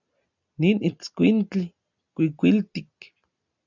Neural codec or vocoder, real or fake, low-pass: none; real; 7.2 kHz